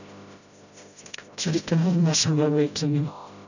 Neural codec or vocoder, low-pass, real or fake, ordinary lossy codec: codec, 16 kHz, 0.5 kbps, FreqCodec, smaller model; 7.2 kHz; fake; none